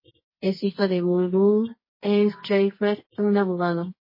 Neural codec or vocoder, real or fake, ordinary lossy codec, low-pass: codec, 24 kHz, 0.9 kbps, WavTokenizer, medium music audio release; fake; MP3, 24 kbps; 5.4 kHz